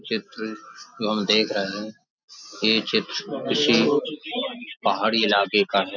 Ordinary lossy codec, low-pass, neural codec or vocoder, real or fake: none; 7.2 kHz; none; real